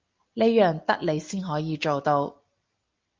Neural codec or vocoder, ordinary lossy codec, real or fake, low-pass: none; Opus, 32 kbps; real; 7.2 kHz